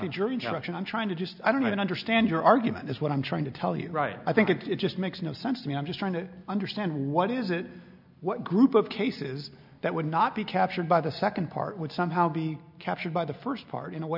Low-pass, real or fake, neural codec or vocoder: 5.4 kHz; real; none